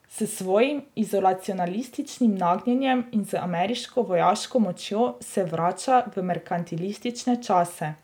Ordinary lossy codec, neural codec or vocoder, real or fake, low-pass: none; none; real; 19.8 kHz